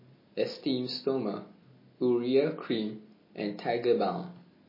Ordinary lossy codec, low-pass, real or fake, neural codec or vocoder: MP3, 24 kbps; 5.4 kHz; real; none